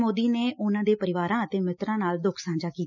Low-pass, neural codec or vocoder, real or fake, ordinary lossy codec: 7.2 kHz; none; real; none